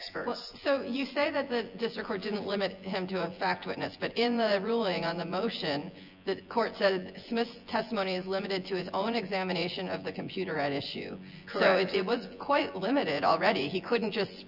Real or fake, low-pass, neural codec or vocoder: fake; 5.4 kHz; vocoder, 24 kHz, 100 mel bands, Vocos